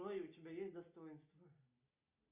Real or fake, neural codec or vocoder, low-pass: real; none; 3.6 kHz